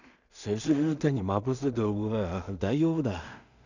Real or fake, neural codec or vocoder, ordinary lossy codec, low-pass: fake; codec, 16 kHz in and 24 kHz out, 0.4 kbps, LongCat-Audio-Codec, two codebook decoder; none; 7.2 kHz